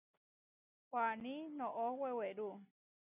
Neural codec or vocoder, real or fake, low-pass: none; real; 3.6 kHz